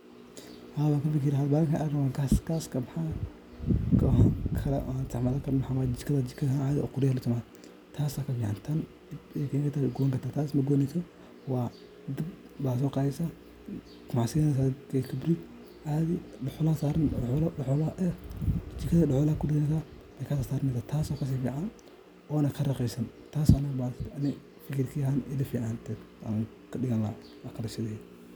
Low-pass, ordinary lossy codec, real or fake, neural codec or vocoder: none; none; real; none